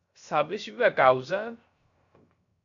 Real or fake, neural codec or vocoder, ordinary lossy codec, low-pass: fake; codec, 16 kHz, 0.3 kbps, FocalCodec; AAC, 48 kbps; 7.2 kHz